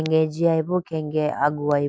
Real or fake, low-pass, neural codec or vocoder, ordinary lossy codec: real; none; none; none